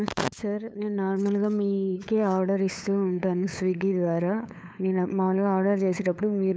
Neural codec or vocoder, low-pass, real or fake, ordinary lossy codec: codec, 16 kHz, 8 kbps, FunCodec, trained on LibriTTS, 25 frames a second; none; fake; none